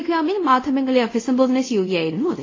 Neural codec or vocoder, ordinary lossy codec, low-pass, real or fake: codec, 24 kHz, 0.5 kbps, DualCodec; AAC, 32 kbps; 7.2 kHz; fake